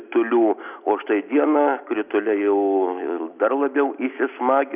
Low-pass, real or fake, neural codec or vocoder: 3.6 kHz; real; none